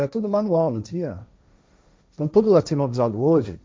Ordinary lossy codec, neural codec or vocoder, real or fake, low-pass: none; codec, 16 kHz, 1.1 kbps, Voila-Tokenizer; fake; none